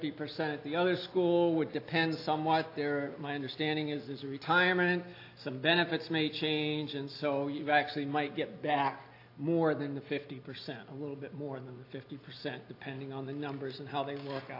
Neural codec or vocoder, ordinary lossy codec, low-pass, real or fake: none; AAC, 32 kbps; 5.4 kHz; real